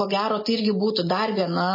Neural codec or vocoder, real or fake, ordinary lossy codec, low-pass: none; real; MP3, 24 kbps; 5.4 kHz